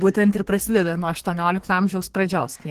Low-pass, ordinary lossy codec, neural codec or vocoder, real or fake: 14.4 kHz; Opus, 16 kbps; codec, 32 kHz, 1.9 kbps, SNAC; fake